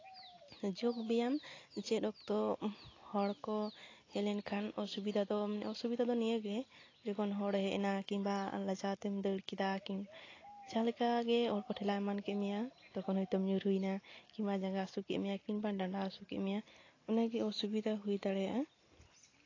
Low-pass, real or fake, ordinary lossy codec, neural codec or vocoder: 7.2 kHz; real; AAC, 32 kbps; none